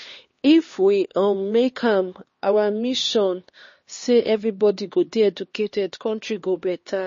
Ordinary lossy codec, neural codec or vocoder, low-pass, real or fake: MP3, 32 kbps; codec, 16 kHz, 2 kbps, X-Codec, HuBERT features, trained on LibriSpeech; 7.2 kHz; fake